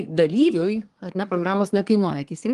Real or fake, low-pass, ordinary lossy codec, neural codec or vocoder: fake; 10.8 kHz; Opus, 24 kbps; codec, 24 kHz, 1 kbps, SNAC